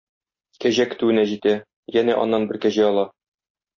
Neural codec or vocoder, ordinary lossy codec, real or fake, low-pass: none; MP3, 32 kbps; real; 7.2 kHz